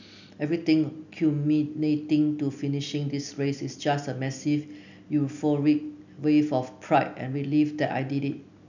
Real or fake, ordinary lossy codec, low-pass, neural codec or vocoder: real; none; 7.2 kHz; none